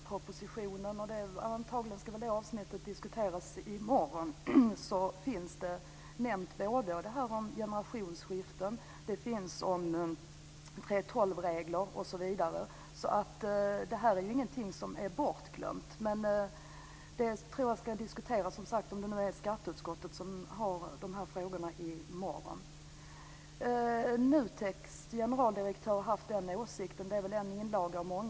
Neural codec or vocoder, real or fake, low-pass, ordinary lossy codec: none; real; none; none